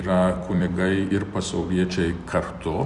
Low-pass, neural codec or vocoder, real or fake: 10.8 kHz; vocoder, 44.1 kHz, 128 mel bands every 256 samples, BigVGAN v2; fake